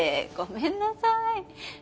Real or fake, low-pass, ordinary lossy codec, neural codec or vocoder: real; none; none; none